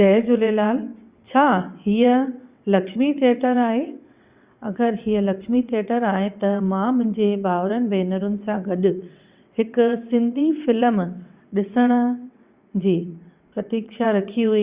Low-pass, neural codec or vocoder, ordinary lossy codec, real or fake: 3.6 kHz; vocoder, 22.05 kHz, 80 mel bands, WaveNeXt; Opus, 64 kbps; fake